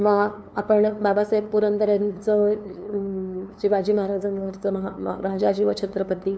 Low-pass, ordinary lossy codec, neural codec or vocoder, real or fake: none; none; codec, 16 kHz, 2 kbps, FunCodec, trained on LibriTTS, 25 frames a second; fake